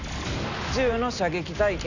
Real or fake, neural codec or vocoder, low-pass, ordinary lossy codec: real; none; 7.2 kHz; none